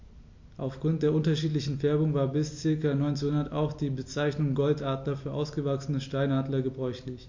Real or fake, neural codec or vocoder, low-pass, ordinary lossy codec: real; none; 7.2 kHz; MP3, 48 kbps